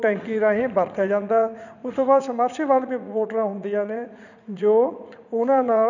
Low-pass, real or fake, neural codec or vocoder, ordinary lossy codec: 7.2 kHz; fake; vocoder, 44.1 kHz, 80 mel bands, Vocos; none